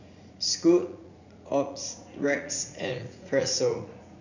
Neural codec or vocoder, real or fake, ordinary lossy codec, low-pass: vocoder, 44.1 kHz, 80 mel bands, Vocos; fake; none; 7.2 kHz